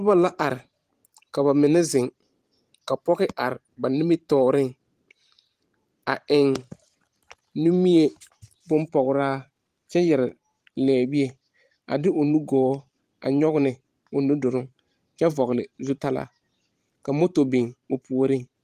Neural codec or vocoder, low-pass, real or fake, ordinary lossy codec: vocoder, 44.1 kHz, 128 mel bands every 512 samples, BigVGAN v2; 14.4 kHz; fake; Opus, 24 kbps